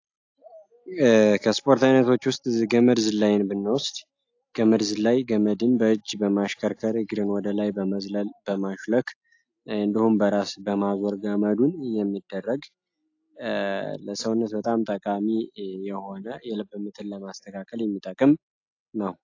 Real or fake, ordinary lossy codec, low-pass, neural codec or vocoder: real; AAC, 48 kbps; 7.2 kHz; none